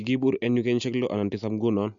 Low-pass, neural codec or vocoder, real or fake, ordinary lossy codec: 7.2 kHz; none; real; MP3, 64 kbps